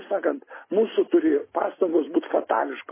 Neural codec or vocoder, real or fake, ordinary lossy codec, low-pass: none; real; MP3, 16 kbps; 3.6 kHz